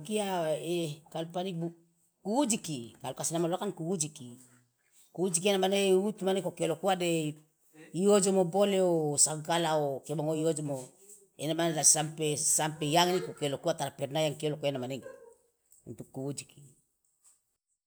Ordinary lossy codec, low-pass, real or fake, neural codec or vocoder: none; none; real; none